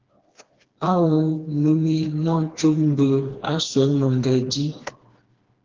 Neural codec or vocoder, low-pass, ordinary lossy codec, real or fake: codec, 16 kHz, 2 kbps, FreqCodec, smaller model; 7.2 kHz; Opus, 16 kbps; fake